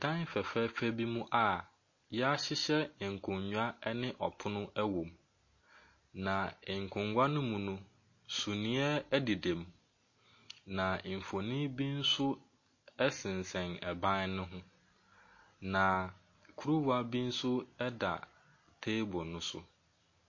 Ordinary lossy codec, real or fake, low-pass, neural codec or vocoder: MP3, 32 kbps; real; 7.2 kHz; none